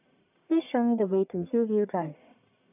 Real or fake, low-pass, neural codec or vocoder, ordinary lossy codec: fake; 3.6 kHz; codec, 44.1 kHz, 1.7 kbps, Pupu-Codec; none